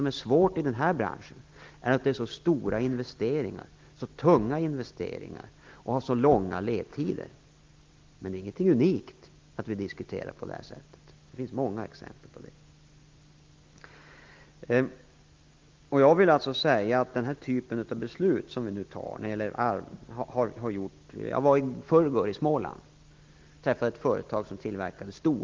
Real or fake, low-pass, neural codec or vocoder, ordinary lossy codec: real; 7.2 kHz; none; Opus, 32 kbps